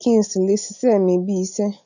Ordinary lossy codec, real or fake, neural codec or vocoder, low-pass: none; real; none; 7.2 kHz